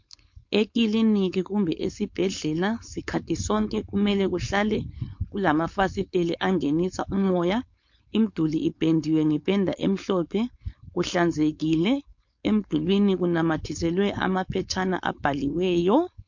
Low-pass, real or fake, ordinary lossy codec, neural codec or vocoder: 7.2 kHz; fake; MP3, 48 kbps; codec, 16 kHz, 4.8 kbps, FACodec